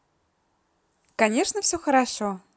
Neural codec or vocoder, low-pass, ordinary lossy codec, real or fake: none; none; none; real